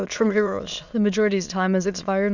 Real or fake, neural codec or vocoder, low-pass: fake; autoencoder, 22.05 kHz, a latent of 192 numbers a frame, VITS, trained on many speakers; 7.2 kHz